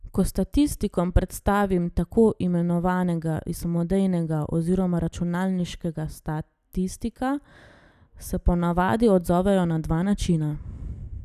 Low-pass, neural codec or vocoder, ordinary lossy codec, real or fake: 14.4 kHz; none; none; real